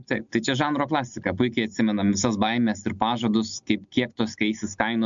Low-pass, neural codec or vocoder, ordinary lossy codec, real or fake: 7.2 kHz; none; MP3, 64 kbps; real